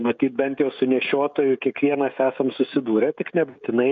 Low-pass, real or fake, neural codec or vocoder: 7.2 kHz; fake; codec, 16 kHz, 16 kbps, FreqCodec, smaller model